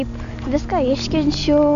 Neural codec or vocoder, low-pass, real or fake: none; 7.2 kHz; real